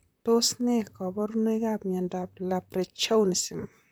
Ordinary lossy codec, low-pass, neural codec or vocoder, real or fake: none; none; vocoder, 44.1 kHz, 128 mel bands, Pupu-Vocoder; fake